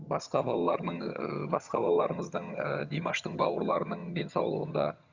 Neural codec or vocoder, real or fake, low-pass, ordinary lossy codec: vocoder, 22.05 kHz, 80 mel bands, HiFi-GAN; fake; 7.2 kHz; Opus, 64 kbps